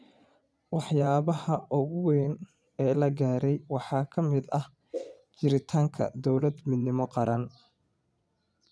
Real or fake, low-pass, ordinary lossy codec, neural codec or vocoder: fake; none; none; vocoder, 22.05 kHz, 80 mel bands, Vocos